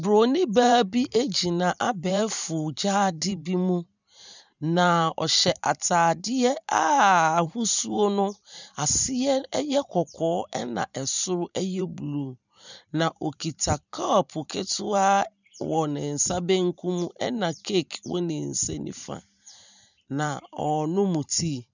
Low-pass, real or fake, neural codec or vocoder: 7.2 kHz; fake; vocoder, 44.1 kHz, 128 mel bands every 512 samples, BigVGAN v2